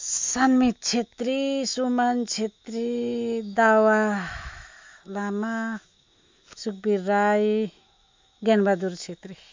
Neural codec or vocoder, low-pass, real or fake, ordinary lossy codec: autoencoder, 48 kHz, 128 numbers a frame, DAC-VAE, trained on Japanese speech; 7.2 kHz; fake; MP3, 64 kbps